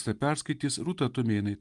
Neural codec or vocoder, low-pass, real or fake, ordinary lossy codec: none; 10.8 kHz; real; Opus, 32 kbps